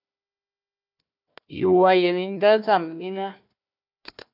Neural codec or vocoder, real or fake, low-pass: codec, 16 kHz, 1 kbps, FunCodec, trained on Chinese and English, 50 frames a second; fake; 5.4 kHz